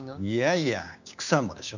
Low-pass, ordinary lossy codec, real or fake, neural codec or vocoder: 7.2 kHz; none; fake; codec, 16 kHz, 6 kbps, DAC